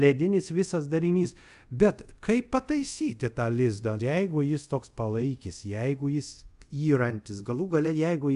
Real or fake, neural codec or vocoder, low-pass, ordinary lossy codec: fake; codec, 24 kHz, 0.5 kbps, DualCodec; 10.8 kHz; AAC, 96 kbps